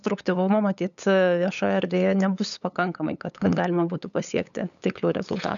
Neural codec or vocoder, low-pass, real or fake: codec, 16 kHz, 8 kbps, FunCodec, trained on LibriTTS, 25 frames a second; 7.2 kHz; fake